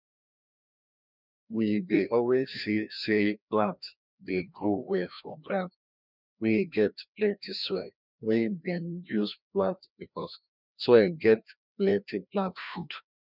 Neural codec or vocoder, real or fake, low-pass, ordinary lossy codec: codec, 16 kHz, 1 kbps, FreqCodec, larger model; fake; 5.4 kHz; none